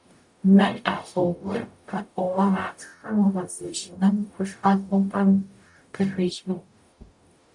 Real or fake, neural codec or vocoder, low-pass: fake; codec, 44.1 kHz, 0.9 kbps, DAC; 10.8 kHz